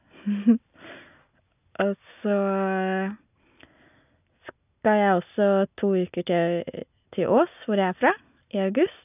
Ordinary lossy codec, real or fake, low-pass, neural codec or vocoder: none; real; 3.6 kHz; none